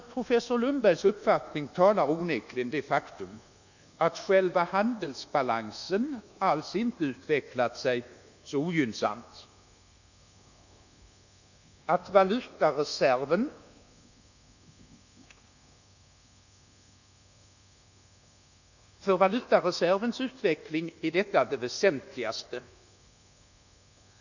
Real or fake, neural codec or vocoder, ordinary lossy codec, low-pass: fake; codec, 24 kHz, 1.2 kbps, DualCodec; none; 7.2 kHz